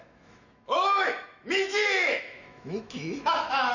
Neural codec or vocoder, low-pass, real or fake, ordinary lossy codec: autoencoder, 48 kHz, 128 numbers a frame, DAC-VAE, trained on Japanese speech; 7.2 kHz; fake; none